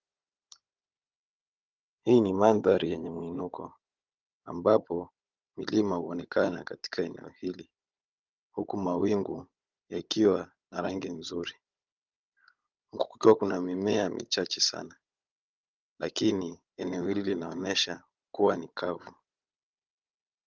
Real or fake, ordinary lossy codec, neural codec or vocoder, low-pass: fake; Opus, 32 kbps; codec, 16 kHz, 16 kbps, FunCodec, trained on Chinese and English, 50 frames a second; 7.2 kHz